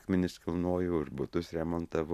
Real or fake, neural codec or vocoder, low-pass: real; none; 14.4 kHz